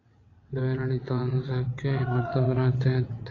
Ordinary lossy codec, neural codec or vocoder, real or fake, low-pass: AAC, 32 kbps; vocoder, 22.05 kHz, 80 mel bands, WaveNeXt; fake; 7.2 kHz